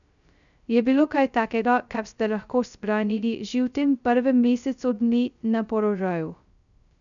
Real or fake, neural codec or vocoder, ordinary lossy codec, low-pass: fake; codec, 16 kHz, 0.2 kbps, FocalCodec; none; 7.2 kHz